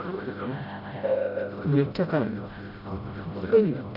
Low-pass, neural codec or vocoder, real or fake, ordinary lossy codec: 5.4 kHz; codec, 16 kHz, 0.5 kbps, FreqCodec, smaller model; fake; Opus, 64 kbps